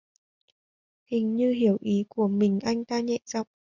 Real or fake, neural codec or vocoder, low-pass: real; none; 7.2 kHz